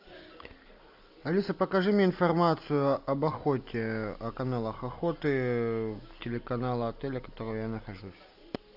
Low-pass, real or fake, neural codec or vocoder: 5.4 kHz; real; none